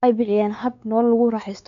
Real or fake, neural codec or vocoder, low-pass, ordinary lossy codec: fake; codec, 16 kHz, 4 kbps, X-Codec, WavLM features, trained on Multilingual LibriSpeech; 7.2 kHz; none